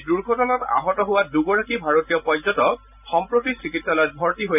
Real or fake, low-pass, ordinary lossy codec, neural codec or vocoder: real; 3.6 kHz; none; none